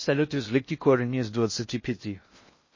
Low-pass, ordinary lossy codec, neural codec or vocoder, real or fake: 7.2 kHz; MP3, 32 kbps; codec, 16 kHz in and 24 kHz out, 0.6 kbps, FocalCodec, streaming, 4096 codes; fake